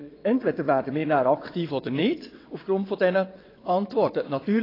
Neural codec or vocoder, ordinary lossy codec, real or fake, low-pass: vocoder, 22.05 kHz, 80 mel bands, WaveNeXt; AAC, 24 kbps; fake; 5.4 kHz